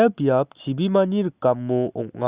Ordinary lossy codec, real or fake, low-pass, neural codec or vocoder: Opus, 64 kbps; real; 3.6 kHz; none